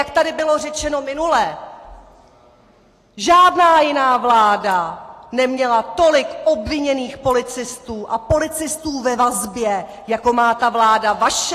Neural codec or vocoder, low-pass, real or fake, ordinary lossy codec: none; 14.4 kHz; real; AAC, 48 kbps